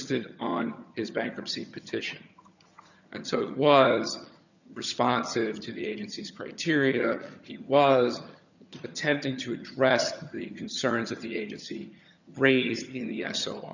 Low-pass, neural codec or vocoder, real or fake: 7.2 kHz; vocoder, 22.05 kHz, 80 mel bands, HiFi-GAN; fake